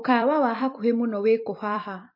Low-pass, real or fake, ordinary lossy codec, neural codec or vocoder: 5.4 kHz; fake; MP3, 32 kbps; vocoder, 44.1 kHz, 128 mel bands every 256 samples, BigVGAN v2